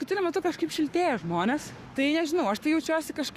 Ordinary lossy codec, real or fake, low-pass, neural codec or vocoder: Opus, 64 kbps; fake; 14.4 kHz; codec, 44.1 kHz, 7.8 kbps, Pupu-Codec